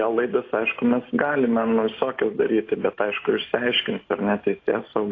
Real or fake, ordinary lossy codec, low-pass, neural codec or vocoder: real; AAC, 32 kbps; 7.2 kHz; none